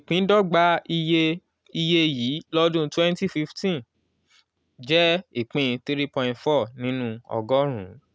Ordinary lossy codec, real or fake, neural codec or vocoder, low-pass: none; real; none; none